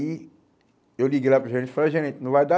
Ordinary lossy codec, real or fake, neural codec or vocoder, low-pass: none; real; none; none